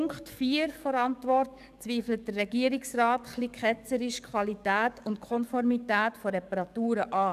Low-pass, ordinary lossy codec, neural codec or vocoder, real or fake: 14.4 kHz; none; codec, 44.1 kHz, 7.8 kbps, DAC; fake